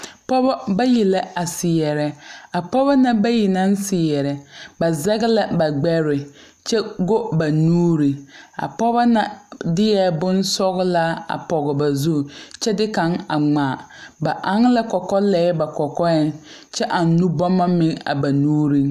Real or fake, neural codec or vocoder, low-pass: real; none; 14.4 kHz